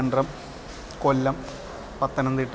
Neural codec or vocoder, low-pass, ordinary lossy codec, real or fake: none; none; none; real